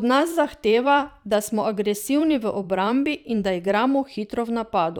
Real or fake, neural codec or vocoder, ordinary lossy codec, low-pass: fake; vocoder, 44.1 kHz, 128 mel bands every 512 samples, BigVGAN v2; none; 19.8 kHz